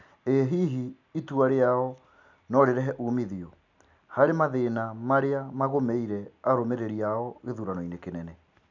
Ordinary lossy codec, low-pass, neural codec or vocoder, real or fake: none; 7.2 kHz; none; real